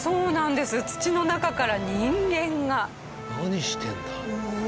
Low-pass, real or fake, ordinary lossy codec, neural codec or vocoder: none; real; none; none